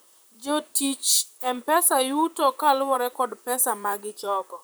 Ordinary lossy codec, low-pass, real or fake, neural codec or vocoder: none; none; fake; vocoder, 44.1 kHz, 128 mel bands, Pupu-Vocoder